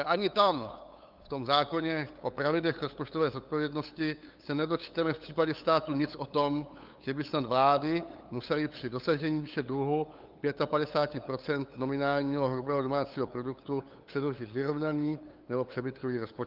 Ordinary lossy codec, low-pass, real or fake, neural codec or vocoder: Opus, 24 kbps; 5.4 kHz; fake; codec, 16 kHz, 8 kbps, FunCodec, trained on LibriTTS, 25 frames a second